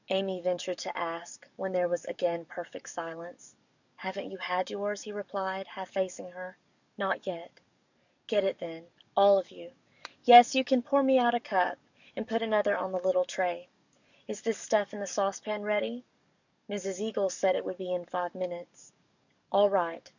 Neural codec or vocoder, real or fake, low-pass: codec, 44.1 kHz, 7.8 kbps, DAC; fake; 7.2 kHz